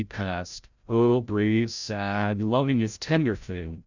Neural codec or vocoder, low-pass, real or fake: codec, 16 kHz, 0.5 kbps, FreqCodec, larger model; 7.2 kHz; fake